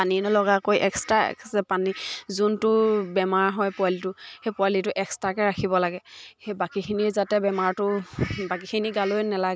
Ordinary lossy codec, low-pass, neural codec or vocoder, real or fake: none; none; none; real